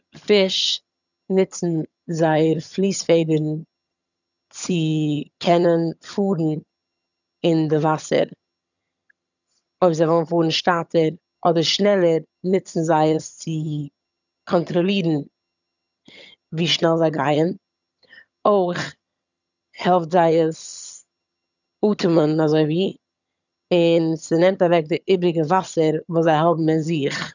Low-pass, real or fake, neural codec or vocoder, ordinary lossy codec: 7.2 kHz; fake; vocoder, 22.05 kHz, 80 mel bands, HiFi-GAN; none